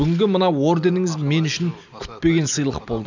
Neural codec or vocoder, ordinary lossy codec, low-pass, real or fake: none; none; 7.2 kHz; real